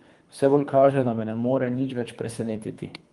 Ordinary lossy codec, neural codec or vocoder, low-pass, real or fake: Opus, 32 kbps; codec, 24 kHz, 3 kbps, HILCodec; 10.8 kHz; fake